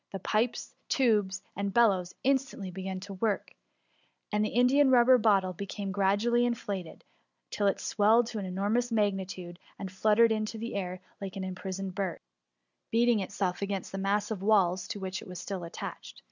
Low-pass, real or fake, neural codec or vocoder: 7.2 kHz; real; none